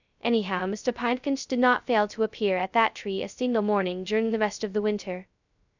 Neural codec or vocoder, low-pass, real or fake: codec, 16 kHz, 0.2 kbps, FocalCodec; 7.2 kHz; fake